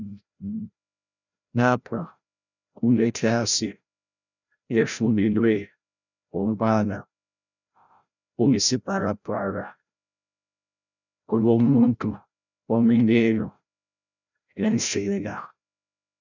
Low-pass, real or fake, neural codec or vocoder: 7.2 kHz; fake; codec, 16 kHz, 0.5 kbps, FreqCodec, larger model